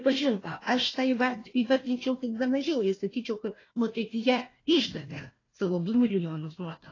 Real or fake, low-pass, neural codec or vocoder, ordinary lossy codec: fake; 7.2 kHz; codec, 16 kHz, 1 kbps, FunCodec, trained on Chinese and English, 50 frames a second; AAC, 32 kbps